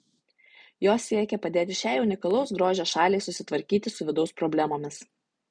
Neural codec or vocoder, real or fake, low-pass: none; real; 9.9 kHz